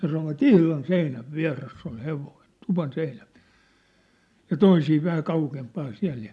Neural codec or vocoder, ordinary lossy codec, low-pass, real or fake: none; none; none; real